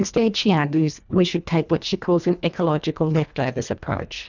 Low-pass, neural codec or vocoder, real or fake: 7.2 kHz; codec, 24 kHz, 1.5 kbps, HILCodec; fake